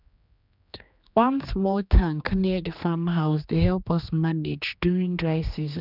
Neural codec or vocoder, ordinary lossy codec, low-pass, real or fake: codec, 16 kHz, 2 kbps, X-Codec, HuBERT features, trained on general audio; none; 5.4 kHz; fake